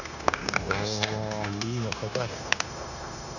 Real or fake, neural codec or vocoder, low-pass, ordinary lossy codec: fake; codec, 16 kHz, 0.9 kbps, LongCat-Audio-Codec; 7.2 kHz; none